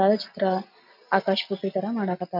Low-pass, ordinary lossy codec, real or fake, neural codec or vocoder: 5.4 kHz; none; real; none